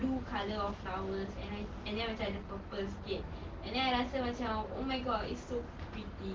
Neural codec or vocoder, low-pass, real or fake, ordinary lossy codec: none; 7.2 kHz; real; Opus, 16 kbps